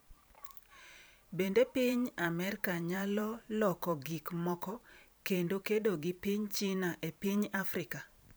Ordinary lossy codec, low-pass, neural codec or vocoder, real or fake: none; none; vocoder, 44.1 kHz, 128 mel bands every 512 samples, BigVGAN v2; fake